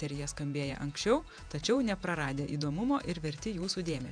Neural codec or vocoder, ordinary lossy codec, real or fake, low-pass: vocoder, 48 kHz, 128 mel bands, Vocos; MP3, 96 kbps; fake; 9.9 kHz